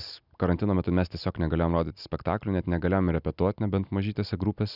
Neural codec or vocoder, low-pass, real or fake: none; 5.4 kHz; real